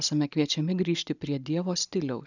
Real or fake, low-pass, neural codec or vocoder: real; 7.2 kHz; none